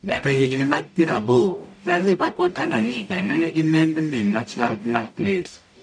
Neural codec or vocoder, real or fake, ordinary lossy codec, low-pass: codec, 44.1 kHz, 0.9 kbps, DAC; fake; none; 9.9 kHz